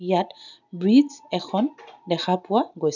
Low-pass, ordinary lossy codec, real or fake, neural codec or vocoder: 7.2 kHz; none; real; none